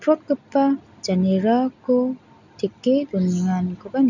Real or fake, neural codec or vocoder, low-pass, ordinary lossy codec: real; none; 7.2 kHz; none